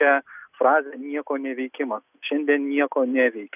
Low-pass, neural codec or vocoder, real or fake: 3.6 kHz; none; real